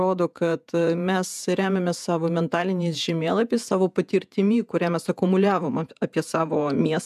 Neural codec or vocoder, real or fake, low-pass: vocoder, 48 kHz, 128 mel bands, Vocos; fake; 14.4 kHz